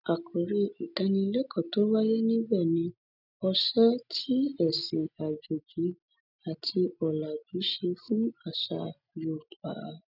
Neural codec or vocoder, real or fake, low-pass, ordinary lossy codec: none; real; 5.4 kHz; none